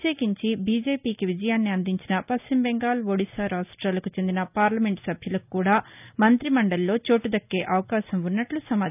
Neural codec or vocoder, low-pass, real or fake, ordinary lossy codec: none; 3.6 kHz; real; none